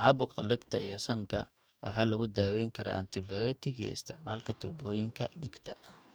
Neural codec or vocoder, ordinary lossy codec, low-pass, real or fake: codec, 44.1 kHz, 2.6 kbps, DAC; none; none; fake